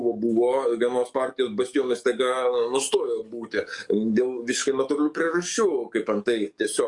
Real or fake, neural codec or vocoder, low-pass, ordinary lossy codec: fake; codec, 44.1 kHz, 7.8 kbps, DAC; 10.8 kHz; Opus, 64 kbps